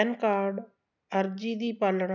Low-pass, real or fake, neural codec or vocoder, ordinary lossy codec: 7.2 kHz; real; none; none